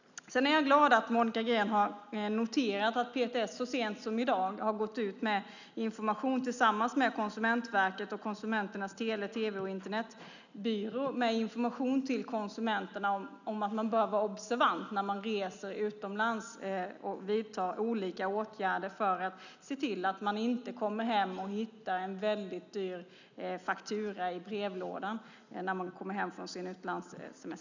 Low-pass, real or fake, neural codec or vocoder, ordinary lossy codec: 7.2 kHz; real; none; none